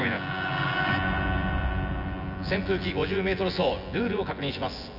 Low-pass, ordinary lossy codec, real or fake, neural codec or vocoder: 5.4 kHz; none; fake; vocoder, 24 kHz, 100 mel bands, Vocos